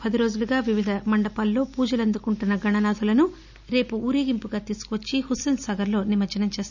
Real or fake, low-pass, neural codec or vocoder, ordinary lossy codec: real; 7.2 kHz; none; none